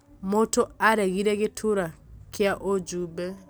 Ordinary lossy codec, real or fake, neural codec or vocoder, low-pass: none; real; none; none